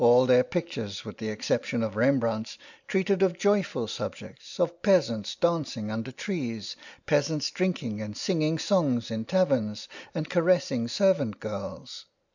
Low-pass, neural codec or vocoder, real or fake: 7.2 kHz; none; real